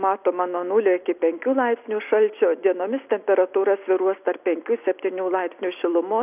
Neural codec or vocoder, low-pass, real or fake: none; 3.6 kHz; real